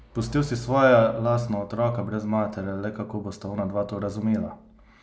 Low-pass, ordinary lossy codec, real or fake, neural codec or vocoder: none; none; real; none